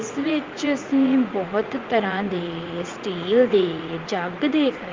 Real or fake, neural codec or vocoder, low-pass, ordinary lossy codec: fake; vocoder, 44.1 kHz, 80 mel bands, Vocos; 7.2 kHz; Opus, 24 kbps